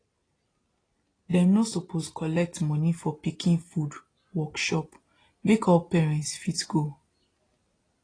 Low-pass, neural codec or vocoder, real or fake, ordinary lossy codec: 9.9 kHz; none; real; AAC, 32 kbps